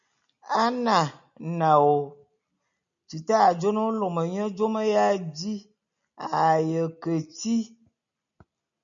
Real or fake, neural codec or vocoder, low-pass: real; none; 7.2 kHz